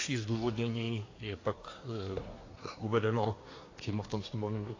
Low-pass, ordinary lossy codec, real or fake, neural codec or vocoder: 7.2 kHz; AAC, 32 kbps; fake; codec, 24 kHz, 1 kbps, SNAC